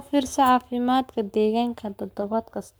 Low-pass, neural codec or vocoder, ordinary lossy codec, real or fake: none; codec, 44.1 kHz, 7.8 kbps, Pupu-Codec; none; fake